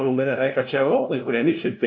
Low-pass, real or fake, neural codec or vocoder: 7.2 kHz; fake; codec, 16 kHz, 0.5 kbps, FunCodec, trained on LibriTTS, 25 frames a second